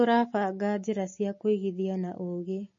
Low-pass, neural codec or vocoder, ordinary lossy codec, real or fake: 7.2 kHz; none; MP3, 32 kbps; real